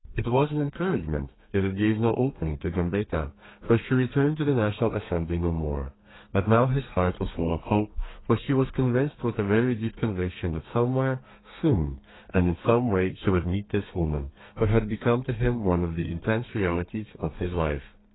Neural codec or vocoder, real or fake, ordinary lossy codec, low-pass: codec, 32 kHz, 1.9 kbps, SNAC; fake; AAC, 16 kbps; 7.2 kHz